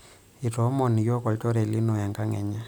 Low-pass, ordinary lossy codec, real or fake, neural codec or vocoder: none; none; real; none